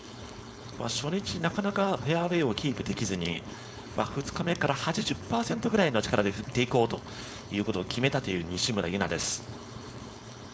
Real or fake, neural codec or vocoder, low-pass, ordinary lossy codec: fake; codec, 16 kHz, 4.8 kbps, FACodec; none; none